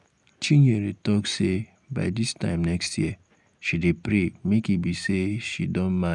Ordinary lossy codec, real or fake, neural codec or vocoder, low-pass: none; real; none; 10.8 kHz